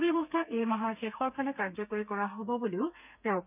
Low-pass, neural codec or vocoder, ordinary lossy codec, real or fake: 3.6 kHz; codec, 32 kHz, 1.9 kbps, SNAC; none; fake